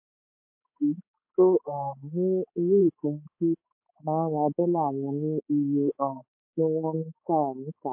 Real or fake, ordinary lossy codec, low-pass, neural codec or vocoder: fake; MP3, 24 kbps; 3.6 kHz; codec, 16 kHz, 4 kbps, X-Codec, HuBERT features, trained on balanced general audio